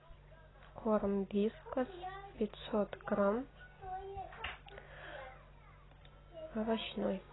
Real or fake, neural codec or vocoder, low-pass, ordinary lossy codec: real; none; 7.2 kHz; AAC, 16 kbps